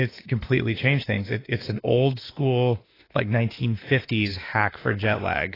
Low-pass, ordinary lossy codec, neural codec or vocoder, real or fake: 5.4 kHz; AAC, 24 kbps; autoencoder, 48 kHz, 32 numbers a frame, DAC-VAE, trained on Japanese speech; fake